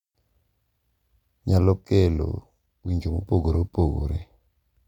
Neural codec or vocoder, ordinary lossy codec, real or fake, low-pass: none; none; real; 19.8 kHz